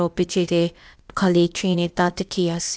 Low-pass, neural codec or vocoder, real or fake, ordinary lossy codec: none; codec, 16 kHz, 0.8 kbps, ZipCodec; fake; none